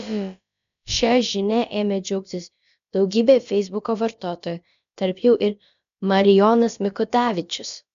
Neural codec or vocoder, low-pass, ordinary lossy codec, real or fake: codec, 16 kHz, about 1 kbps, DyCAST, with the encoder's durations; 7.2 kHz; MP3, 64 kbps; fake